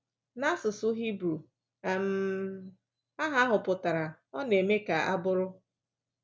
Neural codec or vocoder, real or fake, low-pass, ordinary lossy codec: none; real; none; none